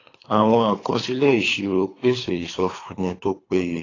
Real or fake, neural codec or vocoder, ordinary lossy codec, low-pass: fake; codec, 24 kHz, 3 kbps, HILCodec; AAC, 32 kbps; 7.2 kHz